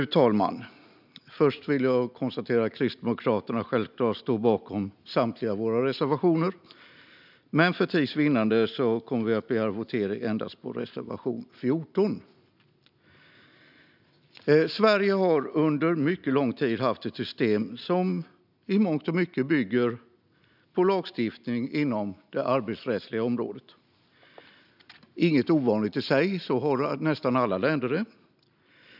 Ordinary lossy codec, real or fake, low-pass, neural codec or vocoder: none; real; 5.4 kHz; none